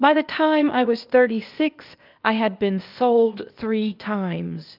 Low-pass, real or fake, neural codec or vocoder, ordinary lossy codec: 5.4 kHz; fake; codec, 16 kHz, 0.8 kbps, ZipCodec; Opus, 24 kbps